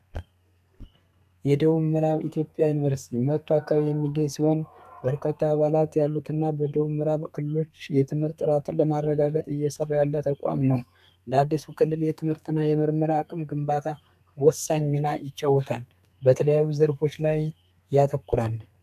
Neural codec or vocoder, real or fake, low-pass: codec, 32 kHz, 1.9 kbps, SNAC; fake; 14.4 kHz